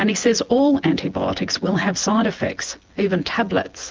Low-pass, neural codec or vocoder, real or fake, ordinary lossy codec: 7.2 kHz; vocoder, 24 kHz, 100 mel bands, Vocos; fake; Opus, 24 kbps